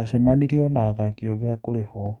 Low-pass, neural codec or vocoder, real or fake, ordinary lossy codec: 19.8 kHz; codec, 44.1 kHz, 2.6 kbps, DAC; fake; none